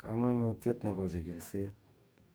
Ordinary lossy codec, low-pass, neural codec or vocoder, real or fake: none; none; codec, 44.1 kHz, 2.6 kbps, DAC; fake